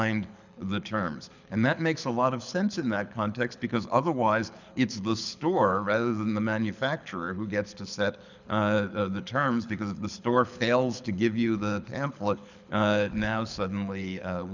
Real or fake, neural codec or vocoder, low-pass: fake; codec, 24 kHz, 6 kbps, HILCodec; 7.2 kHz